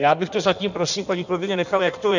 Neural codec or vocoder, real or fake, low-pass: codec, 16 kHz in and 24 kHz out, 1.1 kbps, FireRedTTS-2 codec; fake; 7.2 kHz